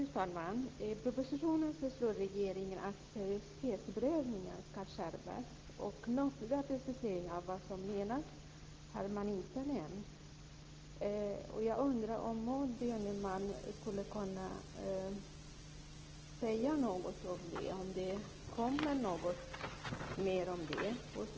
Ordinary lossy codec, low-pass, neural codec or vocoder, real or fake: Opus, 16 kbps; 7.2 kHz; none; real